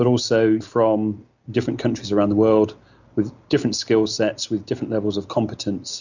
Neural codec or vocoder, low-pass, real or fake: none; 7.2 kHz; real